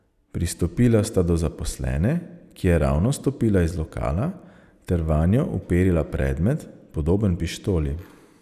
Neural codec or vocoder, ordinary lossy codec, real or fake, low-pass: none; none; real; 14.4 kHz